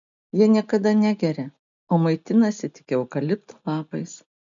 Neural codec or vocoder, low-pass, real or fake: none; 7.2 kHz; real